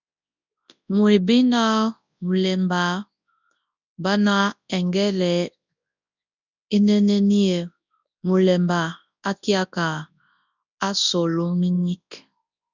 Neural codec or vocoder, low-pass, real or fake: codec, 24 kHz, 0.9 kbps, WavTokenizer, large speech release; 7.2 kHz; fake